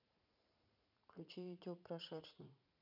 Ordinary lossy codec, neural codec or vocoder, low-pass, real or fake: none; vocoder, 44.1 kHz, 80 mel bands, Vocos; 5.4 kHz; fake